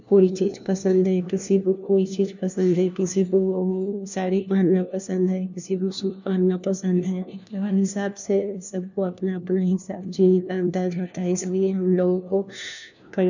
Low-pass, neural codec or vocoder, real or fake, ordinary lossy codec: 7.2 kHz; codec, 16 kHz, 1 kbps, FunCodec, trained on LibriTTS, 50 frames a second; fake; none